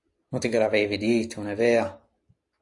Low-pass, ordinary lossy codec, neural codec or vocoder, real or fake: 10.8 kHz; AAC, 48 kbps; none; real